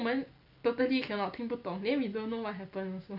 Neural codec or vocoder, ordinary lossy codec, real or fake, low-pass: none; none; real; 5.4 kHz